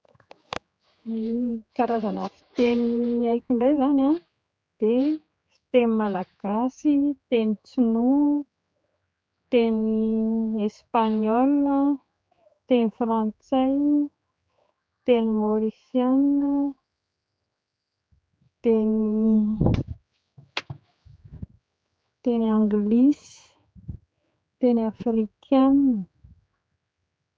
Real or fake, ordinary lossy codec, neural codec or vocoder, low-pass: fake; none; codec, 16 kHz, 4 kbps, X-Codec, HuBERT features, trained on general audio; none